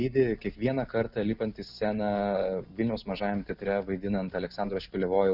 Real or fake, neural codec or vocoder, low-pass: real; none; 5.4 kHz